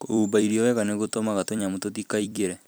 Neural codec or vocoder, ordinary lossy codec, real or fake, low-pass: vocoder, 44.1 kHz, 128 mel bands every 256 samples, BigVGAN v2; none; fake; none